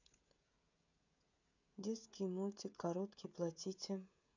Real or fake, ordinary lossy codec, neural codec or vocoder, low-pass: fake; none; codec, 16 kHz, 16 kbps, FreqCodec, smaller model; 7.2 kHz